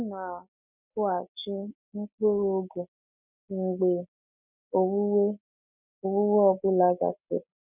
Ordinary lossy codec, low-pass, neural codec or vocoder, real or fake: none; 3.6 kHz; none; real